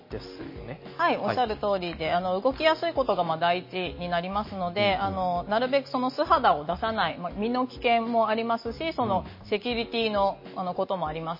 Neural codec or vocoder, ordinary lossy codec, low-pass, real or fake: none; MP3, 24 kbps; 5.4 kHz; real